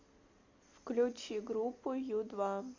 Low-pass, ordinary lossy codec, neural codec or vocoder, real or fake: 7.2 kHz; MP3, 64 kbps; none; real